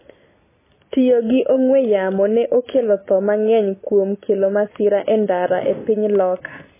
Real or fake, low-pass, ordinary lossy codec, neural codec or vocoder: real; 3.6 kHz; MP3, 16 kbps; none